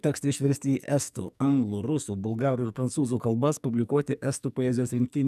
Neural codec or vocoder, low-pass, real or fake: codec, 44.1 kHz, 2.6 kbps, SNAC; 14.4 kHz; fake